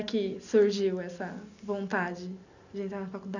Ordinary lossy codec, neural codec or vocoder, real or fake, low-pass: none; none; real; 7.2 kHz